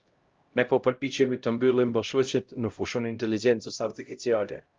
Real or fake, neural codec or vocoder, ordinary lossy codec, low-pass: fake; codec, 16 kHz, 0.5 kbps, X-Codec, HuBERT features, trained on LibriSpeech; Opus, 32 kbps; 7.2 kHz